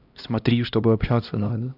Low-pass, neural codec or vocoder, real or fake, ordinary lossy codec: 5.4 kHz; codec, 16 kHz, 1 kbps, X-Codec, HuBERT features, trained on LibriSpeech; fake; none